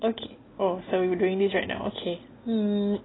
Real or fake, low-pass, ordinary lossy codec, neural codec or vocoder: real; 7.2 kHz; AAC, 16 kbps; none